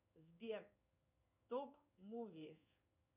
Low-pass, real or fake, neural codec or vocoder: 3.6 kHz; fake; codec, 16 kHz in and 24 kHz out, 1 kbps, XY-Tokenizer